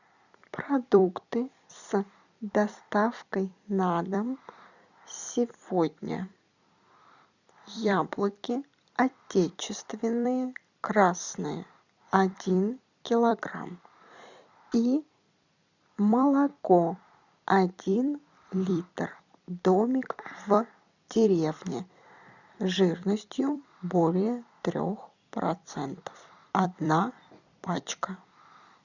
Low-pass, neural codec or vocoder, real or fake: 7.2 kHz; vocoder, 44.1 kHz, 128 mel bands every 256 samples, BigVGAN v2; fake